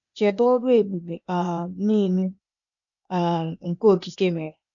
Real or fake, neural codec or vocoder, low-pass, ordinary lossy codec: fake; codec, 16 kHz, 0.8 kbps, ZipCodec; 7.2 kHz; none